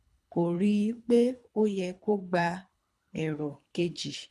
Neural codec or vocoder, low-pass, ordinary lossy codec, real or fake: codec, 24 kHz, 3 kbps, HILCodec; none; none; fake